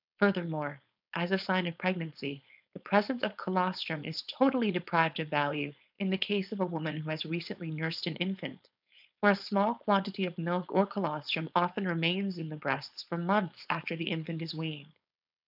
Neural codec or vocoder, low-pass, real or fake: codec, 16 kHz, 4.8 kbps, FACodec; 5.4 kHz; fake